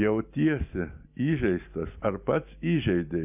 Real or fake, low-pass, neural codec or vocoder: real; 3.6 kHz; none